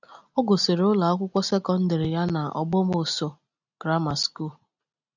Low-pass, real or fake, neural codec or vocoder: 7.2 kHz; real; none